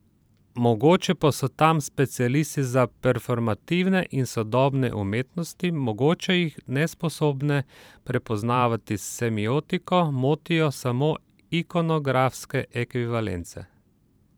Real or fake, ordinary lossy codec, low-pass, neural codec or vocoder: fake; none; none; vocoder, 44.1 kHz, 128 mel bands every 512 samples, BigVGAN v2